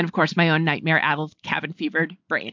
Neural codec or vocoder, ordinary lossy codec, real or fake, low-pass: none; MP3, 64 kbps; real; 7.2 kHz